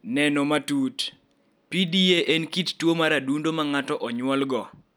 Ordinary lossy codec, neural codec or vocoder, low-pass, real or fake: none; none; none; real